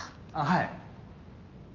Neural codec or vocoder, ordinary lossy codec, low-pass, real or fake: none; Opus, 32 kbps; 7.2 kHz; real